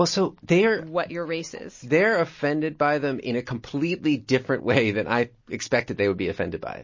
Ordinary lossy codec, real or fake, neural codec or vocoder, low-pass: MP3, 32 kbps; real; none; 7.2 kHz